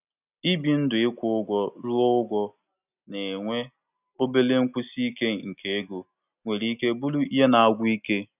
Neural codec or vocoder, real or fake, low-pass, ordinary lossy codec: none; real; 3.6 kHz; none